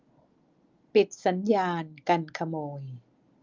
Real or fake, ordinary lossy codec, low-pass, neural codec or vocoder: real; Opus, 24 kbps; 7.2 kHz; none